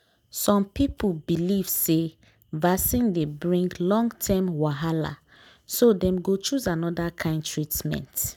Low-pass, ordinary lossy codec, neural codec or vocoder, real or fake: 19.8 kHz; none; none; real